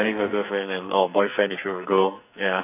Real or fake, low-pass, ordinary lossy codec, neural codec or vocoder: fake; 3.6 kHz; none; codec, 44.1 kHz, 2.6 kbps, SNAC